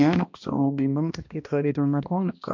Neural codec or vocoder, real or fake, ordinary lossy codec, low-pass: codec, 16 kHz, 1 kbps, X-Codec, HuBERT features, trained on balanced general audio; fake; MP3, 48 kbps; 7.2 kHz